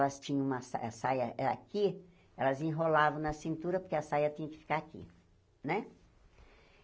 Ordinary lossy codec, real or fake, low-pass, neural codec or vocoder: none; real; none; none